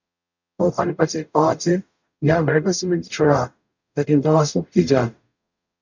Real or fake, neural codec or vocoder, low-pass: fake; codec, 44.1 kHz, 0.9 kbps, DAC; 7.2 kHz